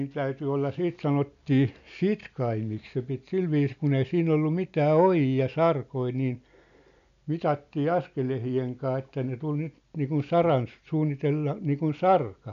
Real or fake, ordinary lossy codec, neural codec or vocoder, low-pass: real; none; none; 7.2 kHz